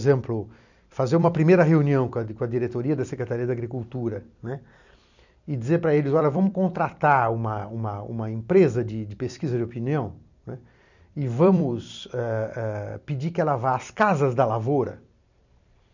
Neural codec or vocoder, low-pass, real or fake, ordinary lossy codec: vocoder, 44.1 kHz, 128 mel bands every 256 samples, BigVGAN v2; 7.2 kHz; fake; none